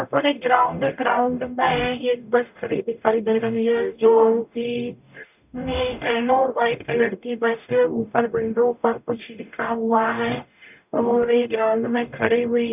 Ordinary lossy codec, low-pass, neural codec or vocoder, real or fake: none; 3.6 kHz; codec, 44.1 kHz, 0.9 kbps, DAC; fake